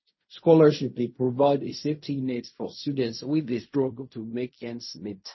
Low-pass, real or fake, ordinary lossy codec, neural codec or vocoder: 7.2 kHz; fake; MP3, 24 kbps; codec, 16 kHz in and 24 kHz out, 0.4 kbps, LongCat-Audio-Codec, fine tuned four codebook decoder